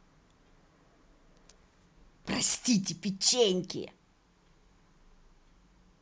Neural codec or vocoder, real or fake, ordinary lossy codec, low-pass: none; real; none; none